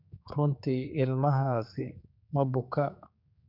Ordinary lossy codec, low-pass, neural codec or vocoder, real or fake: none; 5.4 kHz; codec, 16 kHz, 4 kbps, X-Codec, HuBERT features, trained on general audio; fake